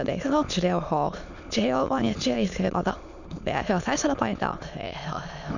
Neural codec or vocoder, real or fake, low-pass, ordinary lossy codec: autoencoder, 22.05 kHz, a latent of 192 numbers a frame, VITS, trained on many speakers; fake; 7.2 kHz; none